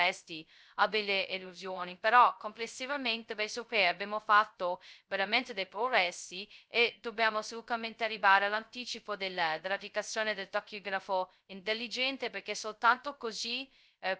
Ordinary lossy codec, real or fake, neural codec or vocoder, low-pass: none; fake; codec, 16 kHz, 0.2 kbps, FocalCodec; none